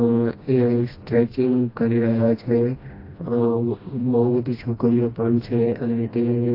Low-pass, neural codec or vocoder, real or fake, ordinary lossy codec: 5.4 kHz; codec, 16 kHz, 1 kbps, FreqCodec, smaller model; fake; AAC, 32 kbps